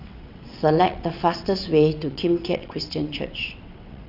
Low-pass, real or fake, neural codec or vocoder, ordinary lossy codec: 5.4 kHz; fake; vocoder, 22.05 kHz, 80 mel bands, WaveNeXt; none